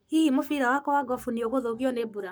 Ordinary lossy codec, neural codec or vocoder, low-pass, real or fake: none; codec, 44.1 kHz, 7.8 kbps, Pupu-Codec; none; fake